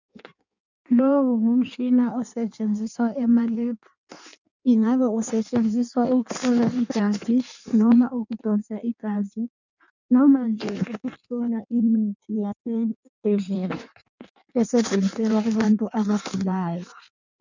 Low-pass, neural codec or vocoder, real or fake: 7.2 kHz; codec, 16 kHz in and 24 kHz out, 1.1 kbps, FireRedTTS-2 codec; fake